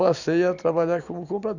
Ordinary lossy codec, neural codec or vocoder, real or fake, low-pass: none; vocoder, 44.1 kHz, 128 mel bands every 256 samples, BigVGAN v2; fake; 7.2 kHz